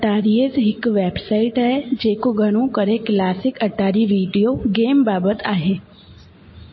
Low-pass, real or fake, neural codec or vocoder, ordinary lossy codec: 7.2 kHz; real; none; MP3, 24 kbps